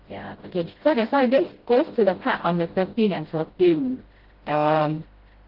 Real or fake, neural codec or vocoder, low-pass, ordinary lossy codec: fake; codec, 16 kHz, 0.5 kbps, FreqCodec, smaller model; 5.4 kHz; Opus, 16 kbps